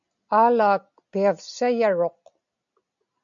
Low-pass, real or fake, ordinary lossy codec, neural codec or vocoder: 7.2 kHz; real; MP3, 96 kbps; none